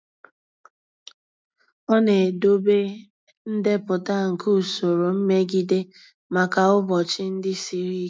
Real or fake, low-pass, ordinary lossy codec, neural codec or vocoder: real; none; none; none